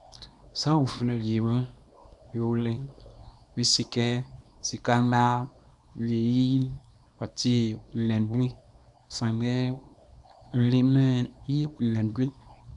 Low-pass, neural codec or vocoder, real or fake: 10.8 kHz; codec, 24 kHz, 0.9 kbps, WavTokenizer, small release; fake